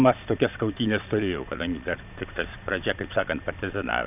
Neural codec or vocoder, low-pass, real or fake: vocoder, 22.05 kHz, 80 mel bands, Vocos; 3.6 kHz; fake